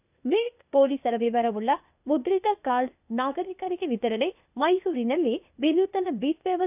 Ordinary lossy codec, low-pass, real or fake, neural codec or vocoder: none; 3.6 kHz; fake; codec, 16 kHz, 0.8 kbps, ZipCodec